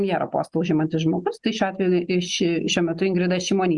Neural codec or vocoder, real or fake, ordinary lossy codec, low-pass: none; real; Opus, 64 kbps; 10.8 kHz